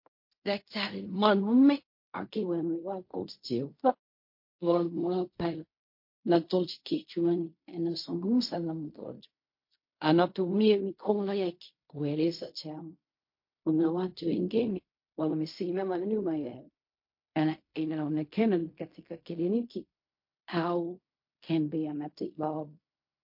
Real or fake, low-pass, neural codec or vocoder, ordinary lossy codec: fake; 5.4 kHz; codec, 16 kHz in and 24 kHz out, 0.4 kbps, LongCat-Audio-Codec, fine tuned four codebook decoder; MP3, 32 kbps